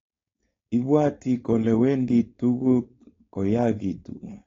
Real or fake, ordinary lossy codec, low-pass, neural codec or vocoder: fake; AAC, 32 kbps; 7.2 kHz; codec, 16 kHz, 4.8 kbps, FACodec